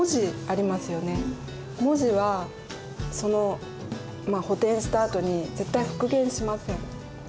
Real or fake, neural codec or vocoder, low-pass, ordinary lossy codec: real; none; none; none